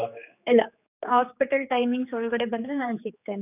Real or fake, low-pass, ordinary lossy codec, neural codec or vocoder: fake; 3.6 kHz; none; codec, 16 kHz, 4 kbps, X-Codec, HuBERT features, trained on general audio